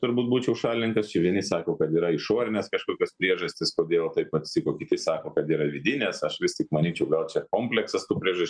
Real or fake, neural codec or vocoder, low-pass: real; none; 9.9 kHz